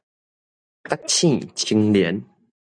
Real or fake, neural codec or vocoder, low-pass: real; none; 9.9 kHz